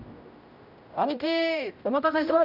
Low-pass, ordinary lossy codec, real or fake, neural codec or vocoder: 5.4 kHz; none; fake; codec, 16 kHz, 0.5 kbps, X-Codec, HuBERT features, trained on general audio